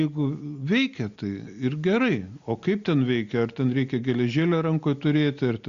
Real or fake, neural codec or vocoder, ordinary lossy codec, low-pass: real; none; Opus, 64 kbps; 7.2 kHz